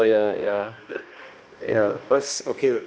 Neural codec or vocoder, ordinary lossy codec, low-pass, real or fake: codec, 16 kHz, 1 kbps, X-Codec, HuBERT features, trained on balanced general audio; none; none; fake